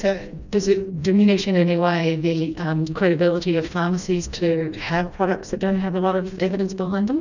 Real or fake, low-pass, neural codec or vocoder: fake; 7.2 kHz; codec, 16 kHz, 1 kbps, FreqCodec, smaller model